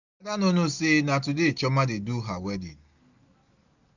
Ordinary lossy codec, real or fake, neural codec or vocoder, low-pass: none; real; none; 7.2 kHz